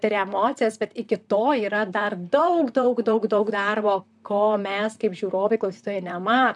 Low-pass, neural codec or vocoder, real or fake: 10.8 kHz; vocoder, 44.1 kHz, 128 mel bands, Pupu-Vocoder; fake